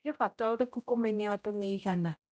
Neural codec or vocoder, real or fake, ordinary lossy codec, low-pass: codec, 16 kHz, 0.5 kbps, X-Codec, HuBERT features, trained on general audio; fake; none; none